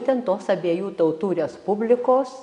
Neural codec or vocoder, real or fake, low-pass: none; real; 10.8 kHz